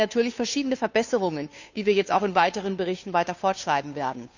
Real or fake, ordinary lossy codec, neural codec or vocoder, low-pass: fake; none; codec, 16 kHz, 2 kbps, FunCodec, trained on Chinese and English, 25 frames a second; 7.2 kHz